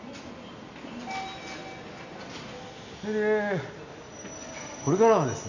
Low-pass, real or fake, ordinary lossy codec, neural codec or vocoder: 7.2 kHz; real; none; none